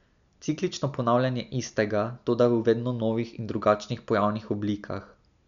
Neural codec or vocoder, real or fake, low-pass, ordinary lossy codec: none; real; 7.2 kHz; none